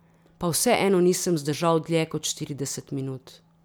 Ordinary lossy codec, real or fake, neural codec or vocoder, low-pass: none; real; none; none